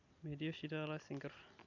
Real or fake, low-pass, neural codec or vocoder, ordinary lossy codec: real; 7.2 kHz; none; none